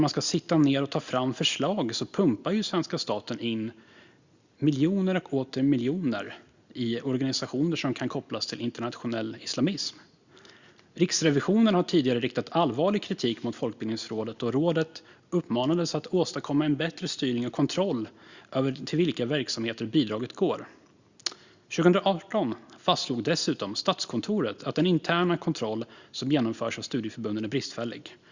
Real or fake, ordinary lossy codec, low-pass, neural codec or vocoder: real; Opus, 64 kbps; 7.2 kHz; none